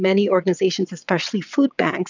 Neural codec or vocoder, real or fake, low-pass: vocoder, 22.05 kHz, 80 mel bands, WaveNeXt; fake; 7.2 kHz